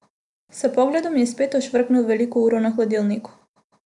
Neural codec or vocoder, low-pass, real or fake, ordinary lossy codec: none; 10.8 kHz; real; none